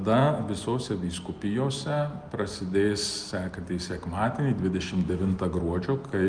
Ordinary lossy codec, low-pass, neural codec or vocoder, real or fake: Opus, 32 kbps; 9.9 kHz; none; real